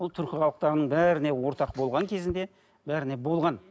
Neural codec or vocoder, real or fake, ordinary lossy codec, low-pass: none; real; none; none